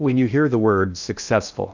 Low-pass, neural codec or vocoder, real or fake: 7.2 kHz; codec, 16 kHz in and 24 kHz out, 0.6 kbps, FocalCodec, streaming, 2048 codes; fake